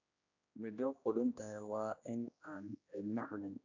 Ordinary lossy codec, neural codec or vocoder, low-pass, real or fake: AAC, 48 kbps; codec, 16 kHz, 1 kbps, X-Codec, HuBERT features, trained on general audio; 7.2 kHz; fake